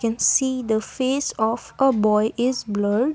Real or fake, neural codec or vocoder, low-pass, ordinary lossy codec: real; none; none; none